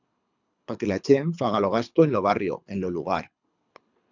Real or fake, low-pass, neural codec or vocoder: fake; 7.2 kHz; codec, 24 kHz, 3 kbps, HILCodec